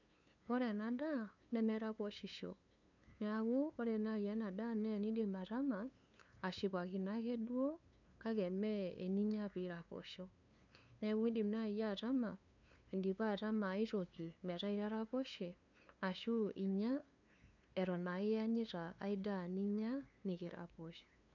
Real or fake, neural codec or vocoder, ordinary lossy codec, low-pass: fake; codec, 16 kHz, 2 kbps, FunCodec, trained on LibriTTS, 25 frames a second; none; 7.2 kHz